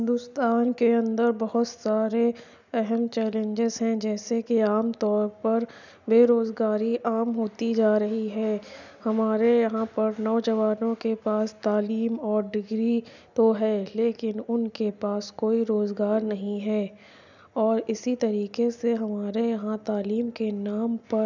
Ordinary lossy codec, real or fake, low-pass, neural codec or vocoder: none; real; 7.2 kHz; none